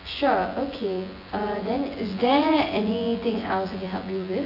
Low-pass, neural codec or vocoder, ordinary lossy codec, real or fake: 5.4 kHz; vocoder, 24 kHz, 100 mel bands, Vocos; AAC, 32 kbps; fake